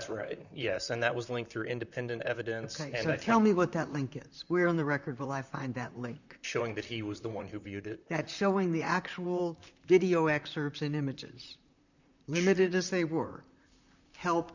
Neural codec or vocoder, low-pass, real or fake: vocoder, 44.1 kHz, 128 mel bands, Pupu-Vocoder; 7.2 kHz; fake